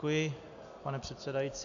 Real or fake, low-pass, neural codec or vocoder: real; 7.2 kHz; none